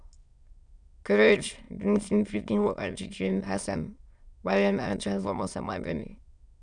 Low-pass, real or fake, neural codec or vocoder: 9.9 kHz; fake; autoencoder, 22.05 kHz, a latent of 192 numbers a frame, VITS, trained on many speakers